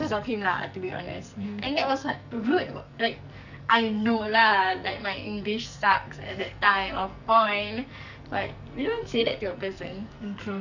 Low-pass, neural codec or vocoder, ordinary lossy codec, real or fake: 7.2 kHz; codec, 44.1 kHz, 2.6 kbps, SNAC; none; fake